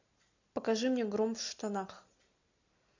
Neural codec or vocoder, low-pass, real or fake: none; 7.2 kHz; real